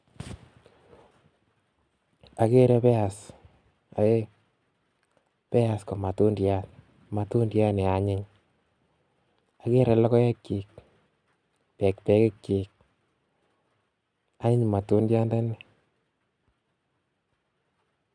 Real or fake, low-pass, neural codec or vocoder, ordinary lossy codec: real; 9.9 kHz; none; none